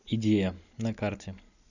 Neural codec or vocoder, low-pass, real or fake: none; 7.2 kHz; real